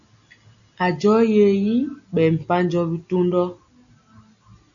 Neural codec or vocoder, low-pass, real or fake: none; 7.2 kHz; real